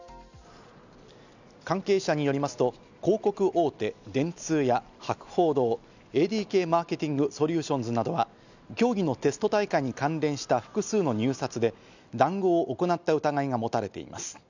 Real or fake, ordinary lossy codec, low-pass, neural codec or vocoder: real; none; 7.2 kHz; none